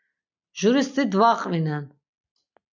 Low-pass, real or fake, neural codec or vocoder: 7.2 kHz; real; none